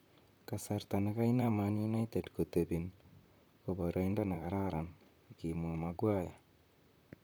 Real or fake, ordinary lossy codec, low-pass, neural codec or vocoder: fake; none; none; vocoder, 44.1 kHz, 128 mel bands, Pupu-Vocoder